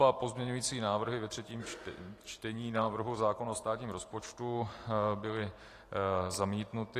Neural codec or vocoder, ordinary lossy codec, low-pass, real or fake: vocoder, 44.1 kHz, 128 mel bands every 512 samples, BigVGAN v2; AAC, 48 kbps; 14.4 kHz; fake